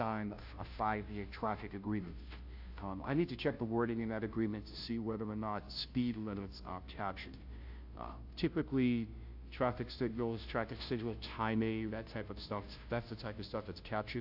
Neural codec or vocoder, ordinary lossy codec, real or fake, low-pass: codec, 16 kHz, 0.5 kbps, FunCodec, trained on Chinese and English, 25 frames a second; AAC, 48 kbps; fake; 5.4 kHz